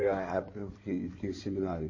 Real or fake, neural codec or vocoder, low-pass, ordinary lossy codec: fake; codec, 16 kHz in and 24 kHz out, 2.2 kbps, FireRedTTS-2 codec; 7.2 kHz; MP3, 32 kbps